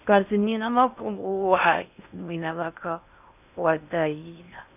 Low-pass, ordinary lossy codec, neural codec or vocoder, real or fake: 3.6 kHz; none; codec, 16 kHz in and 24 kHz out, 0.6 kbps, FocalCodec, streaming, 4096 codes; fake